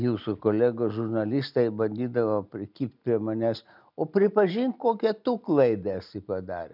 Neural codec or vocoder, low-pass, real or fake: none; 5.4 kHz; real